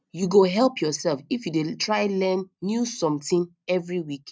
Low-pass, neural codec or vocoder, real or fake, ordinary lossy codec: none; none; real; none